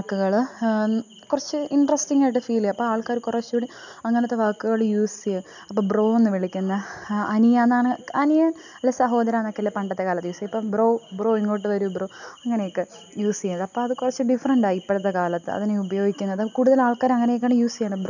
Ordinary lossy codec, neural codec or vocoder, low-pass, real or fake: none; none; 7.2 kHz; real